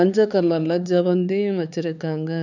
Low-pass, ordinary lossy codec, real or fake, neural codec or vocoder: 7.2 kHz; none; fake; codec, 16 kHz, 4 kbps, X-Codec, HuBERT features, trained on LibriSpeech